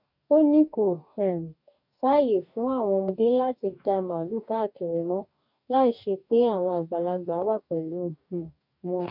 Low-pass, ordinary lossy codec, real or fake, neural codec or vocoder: 5.4 kHz; none; fake; codec, 44.1 kHz, 2.6 kbps, DAC